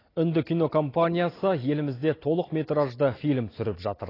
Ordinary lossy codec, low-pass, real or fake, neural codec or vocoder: AAC, 24 kbps; 5.4 kHz; real; none